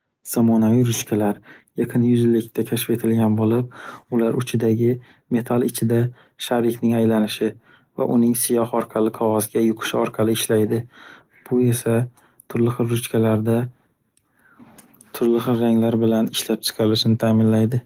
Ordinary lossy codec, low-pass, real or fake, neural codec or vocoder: Opus, 24 kbps; 19.8 kHz; real; none